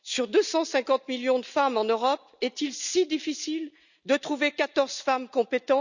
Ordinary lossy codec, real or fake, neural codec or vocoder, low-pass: none; real; none; 7.2 kHz